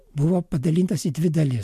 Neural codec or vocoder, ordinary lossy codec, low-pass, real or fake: none; MP3, 64 kbps; 14.4 kHz; real